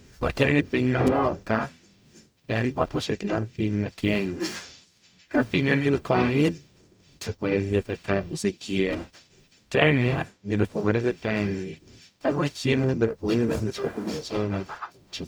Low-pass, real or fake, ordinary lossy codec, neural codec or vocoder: none; fake; none; codec, 44.1 kHz, 0.9 kbps, DAC